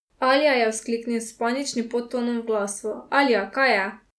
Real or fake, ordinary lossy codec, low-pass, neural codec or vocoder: real; none; none; none